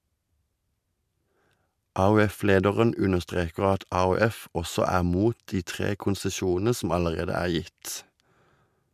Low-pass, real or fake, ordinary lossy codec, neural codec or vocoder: 14.4 kHz; real; MP3, 96 kbps; none